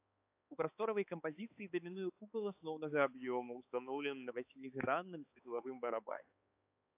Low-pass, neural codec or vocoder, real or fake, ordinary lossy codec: 3.6 kHz; codec, 16 kHz, 4 kbps, X-Codec, HuBERT features, trained on balanced general audio; fake; AAC, 32 kbps